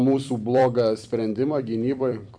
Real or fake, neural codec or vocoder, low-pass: fake; vocoder, 44.1 kHz, 128 mel bands every 256 samples, BigVGAN v2; 9.9 kHz